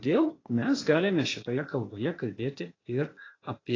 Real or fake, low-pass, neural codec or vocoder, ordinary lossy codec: fake; 7.2 kHz; codec, 16 kHz, 0.8 kbps, ZipCodec; AAC, 32 kbps